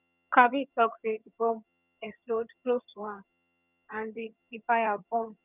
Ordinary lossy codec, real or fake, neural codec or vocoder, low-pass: none; fake; vocoder, 22.05 kHz, 80 mel bands, HiFi-GAN; 3.6 kHz